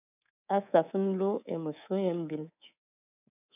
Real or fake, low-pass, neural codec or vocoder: fake; 3.6 kHz; codec, 24 kHz, 3.1 kbps, DualCodec